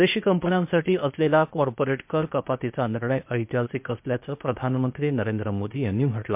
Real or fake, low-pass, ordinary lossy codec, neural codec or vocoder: fake; 3.6 kHz; MP3, 32 kbps; codec, 16 kHz, 0.8 kbps, ZipCodec